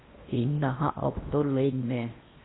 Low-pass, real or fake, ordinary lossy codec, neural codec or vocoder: 7.2 kHz; fake; AAC, 16 kbps; codec, 16 kHz in and 24 kHz out, 0.8 kbps, FocalCodec, streaming, 65536 codes